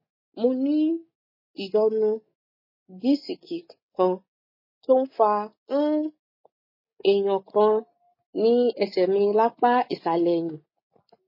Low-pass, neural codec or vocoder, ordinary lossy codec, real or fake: 5.4 kHz; none; MP3, 24 kbps; real